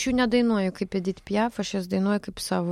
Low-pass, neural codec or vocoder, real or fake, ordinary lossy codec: 19.8 kHz; none; real; MP3, 64 kbps